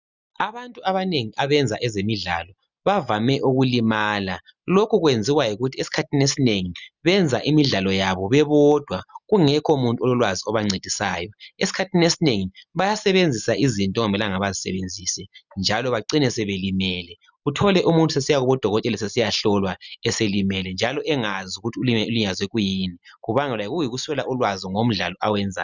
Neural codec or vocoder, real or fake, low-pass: none; real; 7.2 kHz